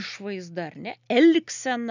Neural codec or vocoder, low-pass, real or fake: none; 7.2 kHz; real